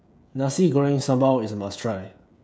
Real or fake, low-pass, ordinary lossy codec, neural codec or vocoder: fake; none; none; codec, 16 kHz, 16 kbps, FreqCodec, smaller model